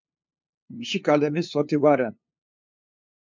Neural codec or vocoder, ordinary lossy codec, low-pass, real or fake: codec, 16 kHz, 2 kbps, FunCodec, trained on LibriTTS, 25 frames a second; MP3, 64 kbps; 7.2 kHz; fake